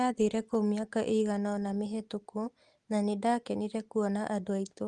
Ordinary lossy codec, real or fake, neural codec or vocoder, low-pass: Opus, 24 kbps; real; none; 10.8 kHz